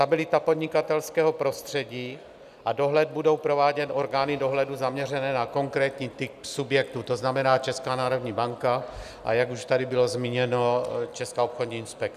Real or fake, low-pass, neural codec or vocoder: real; 14.4 kHz; none